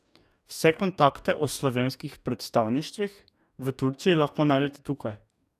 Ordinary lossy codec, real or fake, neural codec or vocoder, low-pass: none; fake; codec, 44.1 kHz, 2.6 kbps, DAC; 14.4 kHz